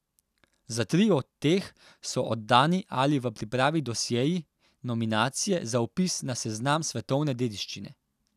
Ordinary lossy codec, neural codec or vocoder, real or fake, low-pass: none; none; real; 14.4 kHz